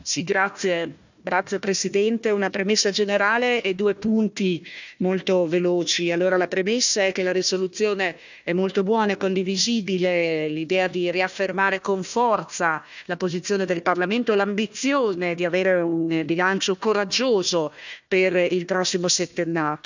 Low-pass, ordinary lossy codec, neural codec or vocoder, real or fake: 7.2 kHz; none; codec, 16 kHz, 1 kbps, FunCodec, trained on Chinese and English, 50 frames a second; fake